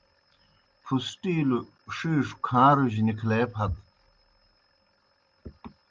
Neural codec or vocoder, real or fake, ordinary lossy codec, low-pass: none; real; Opus, 24 kbps; 7.2 kHz